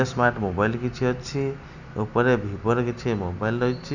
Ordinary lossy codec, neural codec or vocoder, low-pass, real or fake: none; none; 7.2 kHz; real